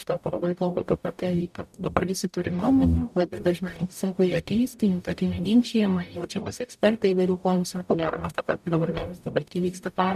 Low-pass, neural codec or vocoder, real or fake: 14.4 kHz; codec, 44.1 kHz, 0.9 kbps, DAC; fake